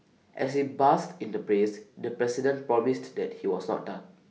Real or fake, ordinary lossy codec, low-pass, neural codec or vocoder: real; none; none; none